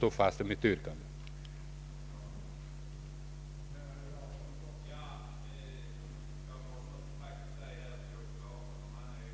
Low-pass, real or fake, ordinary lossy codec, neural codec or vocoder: none; real; none; none